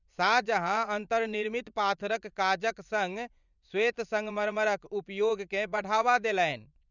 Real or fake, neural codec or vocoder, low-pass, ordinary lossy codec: fake; vocoder, 24 kHz, 100 mel bands, Vocos; 7.2 kHz; none